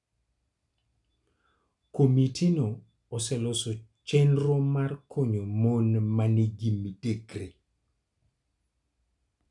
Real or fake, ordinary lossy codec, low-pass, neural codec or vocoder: real; none; 10.8 kHz; none